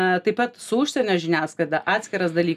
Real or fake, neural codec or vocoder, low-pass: real; none; 14.4 kHz